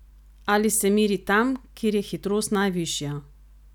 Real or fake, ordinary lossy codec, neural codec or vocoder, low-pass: real; none; none; 19.8 kHz